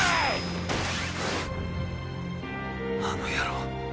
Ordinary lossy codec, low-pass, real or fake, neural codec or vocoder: none; none; real; none